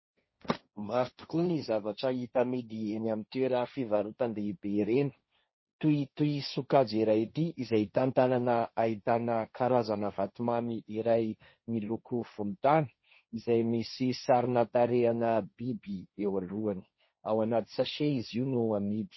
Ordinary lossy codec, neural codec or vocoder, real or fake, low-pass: MP3, 24 kbps; codec, 16 kHz, 1.1 kbps, Voila-Tokenizer; fake; 7.2 kHz